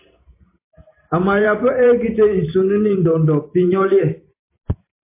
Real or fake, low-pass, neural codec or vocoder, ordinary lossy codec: fake; 3.6 kHz; vocoder, 44.1 kHz, 128 mel bands every 512 samples, BigVGAN v2; MP3, 24 kbps